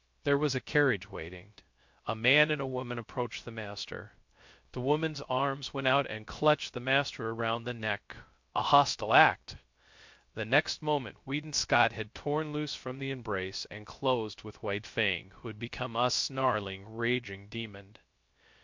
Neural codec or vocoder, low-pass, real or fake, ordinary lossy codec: codec, 16 kHz, 0.3 kbps, FocalCodec; 7.2 kHz; fake; MP3, 48 kbps